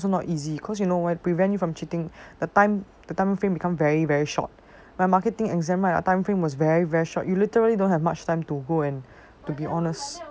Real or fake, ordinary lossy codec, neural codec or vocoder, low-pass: real; none; none; none